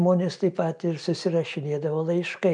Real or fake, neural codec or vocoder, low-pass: real; none; 9.9 kHz